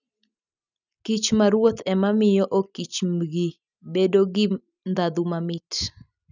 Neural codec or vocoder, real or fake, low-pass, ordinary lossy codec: none; real; 7.2 kHz; none